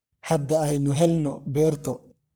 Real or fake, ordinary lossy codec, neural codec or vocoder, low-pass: fake; none; codec, 44.1 kHz, 3.4 kbps, Pupu-Codec; none